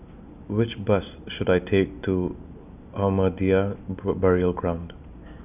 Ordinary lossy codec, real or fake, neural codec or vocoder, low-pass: none; real; none; 3.6 kHz